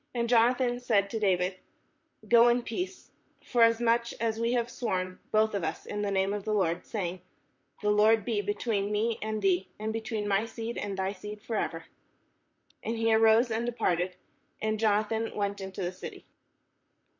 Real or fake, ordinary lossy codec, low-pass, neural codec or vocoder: fake; MP3, 48 kbps; 7.2 kHz; vocoder, 44.1 kHz, 128 mel bands, Pupu-Vocoder